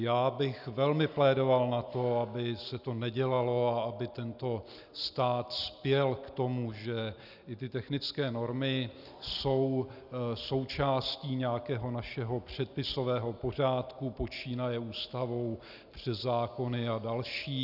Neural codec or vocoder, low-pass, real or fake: none; 5.4 kHz; real